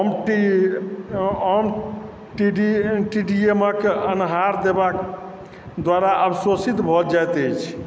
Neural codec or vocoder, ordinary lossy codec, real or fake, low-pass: none; none; real; none